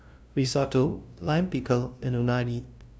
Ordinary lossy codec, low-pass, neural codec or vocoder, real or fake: none; none; codec, 16 kHz, 0.5 kbps, FunCodec, trained on LibriTTS, 25 frames a second; fake